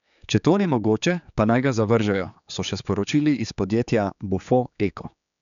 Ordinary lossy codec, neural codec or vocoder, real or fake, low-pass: none; codec, 16 kHz, 4 kbps, X-Codec, HuBERT features, trained on general audio; fake; 7.2 kHz